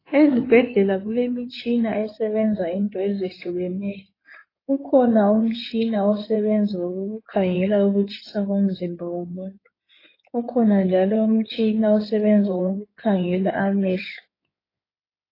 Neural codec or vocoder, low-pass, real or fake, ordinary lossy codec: codec, 16 kHz in and 24 kHz out, 2.2 kbps, FireRedTTS-2 codec; 5.4 kHz; fake; AAC, 24 kbps